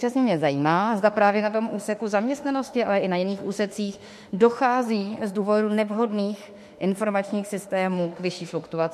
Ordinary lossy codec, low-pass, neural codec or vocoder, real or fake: MP3, 64 kbps; 14.4 kHz; autoencoder, 48 kHz, 32 numbers a frame, DAC-VAE, trained on Japanese speech; fake